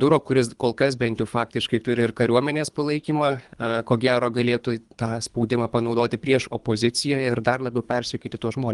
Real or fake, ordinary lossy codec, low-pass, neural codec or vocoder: fake; Opus, 32 kbps; 10.8 kHz; codec, 24 kHz, 3 kbps, HILCodec